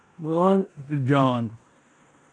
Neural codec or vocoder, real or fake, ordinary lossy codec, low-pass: codec, 16 kHz in and 24 kHz out, 0.9 kbps, LongCat-Audio-Codec, four codebook decoder; fake; AAC, 48 kbps; 9.9 kHz